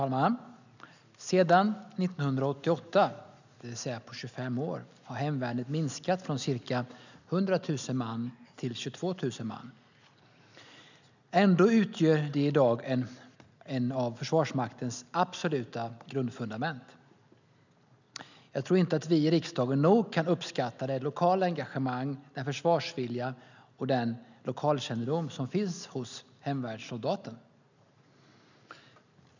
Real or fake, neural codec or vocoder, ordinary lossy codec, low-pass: real; none; none; 7.2 kHz